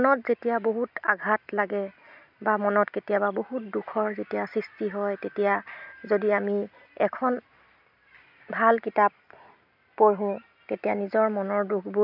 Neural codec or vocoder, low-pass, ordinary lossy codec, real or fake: none; 5.4 kHz; none; real